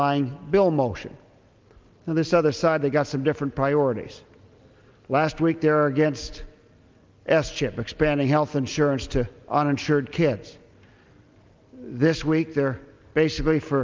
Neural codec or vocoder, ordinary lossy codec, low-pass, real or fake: none; Opus, 32 kbps; 7.2 kHz; real